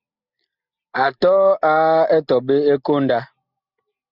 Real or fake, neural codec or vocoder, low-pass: real; none; 5.4 kHz